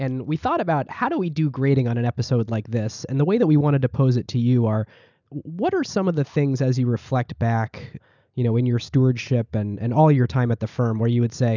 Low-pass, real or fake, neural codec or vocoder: 7.2 kHz; real; none